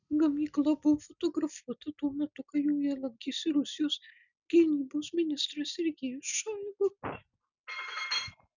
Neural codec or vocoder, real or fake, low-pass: none; real; 7.2 kHz